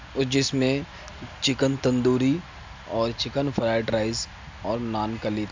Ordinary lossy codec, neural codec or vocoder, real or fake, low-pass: MP3, 64 kbps; none; real; 7.2 kHz